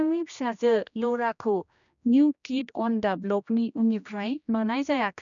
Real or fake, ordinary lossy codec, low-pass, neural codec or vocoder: fake; none; 7.2 kHz; codec, 16 kHz, 1 kbps, X-Codec, HuBERT features, trained on general audio